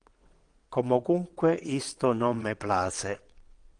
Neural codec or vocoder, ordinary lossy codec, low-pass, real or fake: vocoder, 22.05 kHz, 80 mel bands, WaveNeXt; Opus, 24 kbps; 9.9 kHz; fake